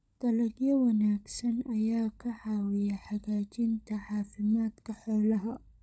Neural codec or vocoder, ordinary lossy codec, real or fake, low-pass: codec, 16 kHz, 16 kbps, FunCodec, trained on LibriTTS, 50 frames a second; none; fake; none